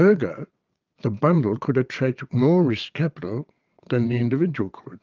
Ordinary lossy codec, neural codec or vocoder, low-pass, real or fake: Opus, 24 kbps; vocoder, 22.05 kHz, 80 mel bands, WaveNeXt; 7.2 kHz; fake